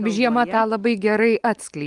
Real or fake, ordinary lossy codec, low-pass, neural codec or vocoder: real; Opus, 32 kbps; 10.8 kHz; none